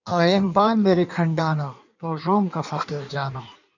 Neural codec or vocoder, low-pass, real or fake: codec, 16 kHz in and 24 kHz out, 1.1 kbps, FireRedTTS-2 codec; 7.2 kHz; fake